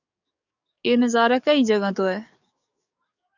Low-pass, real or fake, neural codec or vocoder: 7.2 kHz; fake; codec, 44.1 kHz, 7.8 kbps, DAC